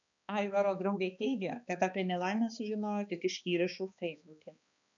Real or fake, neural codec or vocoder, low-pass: fake; codec, 16 kHz, 2 kbps, X-Codec, HuBERT features, trained on balanced general audio; 7.2 kHz